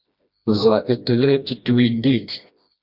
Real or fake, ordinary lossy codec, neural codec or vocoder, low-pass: fake; Opus, 64 kbps; codec, 16 kHz, 1 kbps, FreqCodec, smaller model; 5.4 kHz